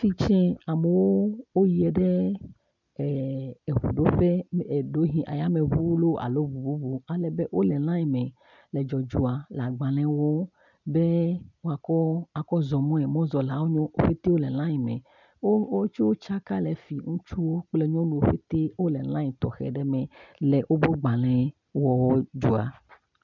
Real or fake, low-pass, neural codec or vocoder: real; 7.2 kHz; none